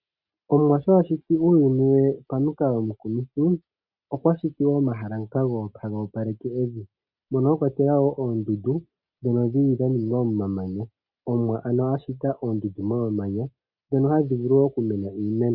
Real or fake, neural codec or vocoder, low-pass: real; none; 5.4 kHz